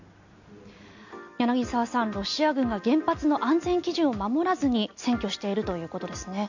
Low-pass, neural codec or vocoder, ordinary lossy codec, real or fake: 7.2 kHz; none; none; real